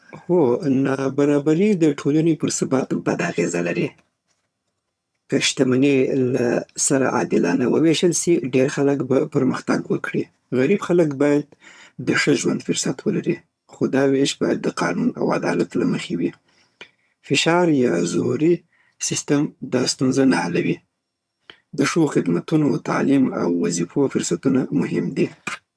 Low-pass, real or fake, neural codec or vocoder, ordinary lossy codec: none; fake; vocoder, 22.05 kHz, 80 mel bands, HiFi-GAN; none